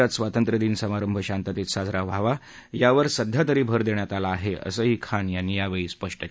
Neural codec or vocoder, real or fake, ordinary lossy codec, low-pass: none; real; none; none